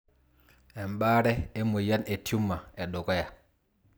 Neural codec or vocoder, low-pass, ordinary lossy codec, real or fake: none; none; none; real